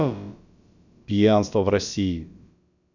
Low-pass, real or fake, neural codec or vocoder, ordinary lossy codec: 7.2 kHz; fake; codec, 16 kHz, about 1 kbps, DyCAST, with the encoder's durations; none